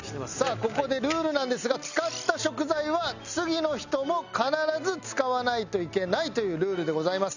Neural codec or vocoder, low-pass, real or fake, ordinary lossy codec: none; 7.2 kHz; real; none